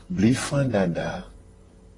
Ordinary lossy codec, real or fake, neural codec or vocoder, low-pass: AAC, 32 kbps; fake; vocoder, 44.1 kHz, 128 mel bands, Pupu-Vocoder; 10.8 kHz